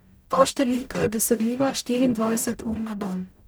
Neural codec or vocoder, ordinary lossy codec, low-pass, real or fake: codec, 44.1 kHz, 0.9 kbps, DAC; none; none; fake